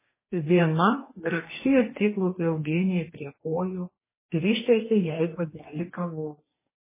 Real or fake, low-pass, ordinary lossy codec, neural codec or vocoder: fake; 3.6 kHz; MP3, 16 kbps; codec, 44.1 kHz, 2.6 kbps, DAC